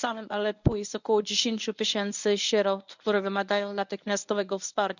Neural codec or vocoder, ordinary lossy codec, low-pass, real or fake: codec, 24 kHz, 0.9 kbps, WavTokenizer, medium speech release version 1; none; 7.2 kHz; fake